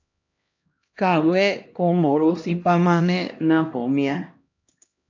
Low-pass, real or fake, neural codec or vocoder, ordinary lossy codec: 7.2 kHz; fake; codec, 16 kHz, 1 kbps, X-Codec, HuBERT features, trained on LibriSpeech; AAC, 48 kbps